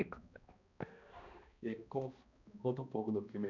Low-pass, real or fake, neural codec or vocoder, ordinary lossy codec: 7.2 kHz; fake; codec, 16 kHz, 1 kbps, X-Codec, HuBERT features, trained on balanced general audio; none